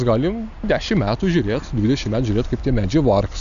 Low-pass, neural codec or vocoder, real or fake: 7.2 kHz; none; real